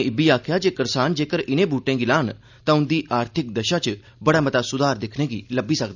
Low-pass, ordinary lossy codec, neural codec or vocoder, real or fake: 7.2 kHz; none; none; real